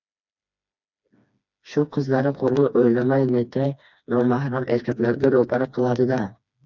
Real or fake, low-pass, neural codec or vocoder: fake; 7.2 kHz; codec, 16 kHz, 2 kbps, FreqCodec, smaller model